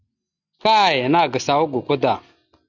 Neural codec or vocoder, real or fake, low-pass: none; real; 7.2 kHz